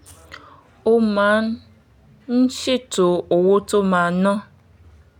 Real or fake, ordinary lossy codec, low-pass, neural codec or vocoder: real; none; 19.8 kHz; none